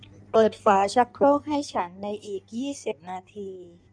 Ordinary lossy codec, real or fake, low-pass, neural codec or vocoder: none; fake; 9.9 kHz; codec, 16 kHz in and 24 kHz out, 1.1 kbps, FireRedTTS-2 codec